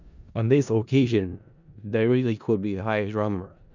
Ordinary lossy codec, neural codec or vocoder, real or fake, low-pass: none; codec, 16 kHz in and 24 kHz out, 0.4 kbps, LongCat-Audio-Codec, four codebook decoder; fake; 7.2 kHz